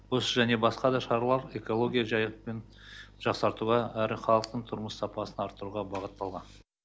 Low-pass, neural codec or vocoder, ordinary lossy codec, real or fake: none; none; none; real